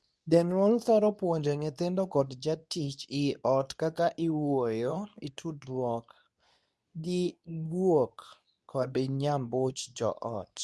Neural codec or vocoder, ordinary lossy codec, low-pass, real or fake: codec, 24 kHz, 0.9 kbps, WavTokenizer, medium speech release version 2; none; none; fake